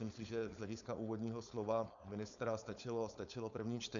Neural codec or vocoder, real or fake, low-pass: codec, 16 kHz, 4.8 kbps, FACodec; fake; 7.2 kHz